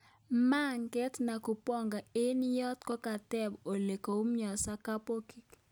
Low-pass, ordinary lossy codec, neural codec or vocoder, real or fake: none; none; none; real